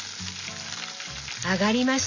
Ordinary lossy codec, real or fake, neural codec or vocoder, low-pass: none; real; none; 7.2 kHz